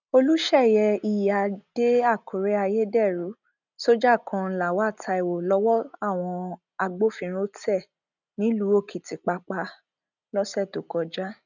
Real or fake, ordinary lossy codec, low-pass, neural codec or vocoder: real; none; 7.2 kHz; none